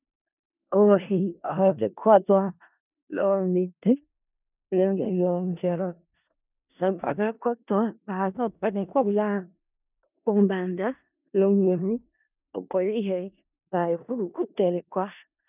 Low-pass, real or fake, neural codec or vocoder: 3.6 kHz; fake; codec, 16 kHz in and 24 kHz out, 0.4 kbps, LongCat-Audio-Codec, four codebook decoder